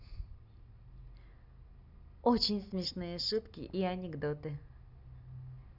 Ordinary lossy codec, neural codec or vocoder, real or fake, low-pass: none; none; real; 5.4 kHz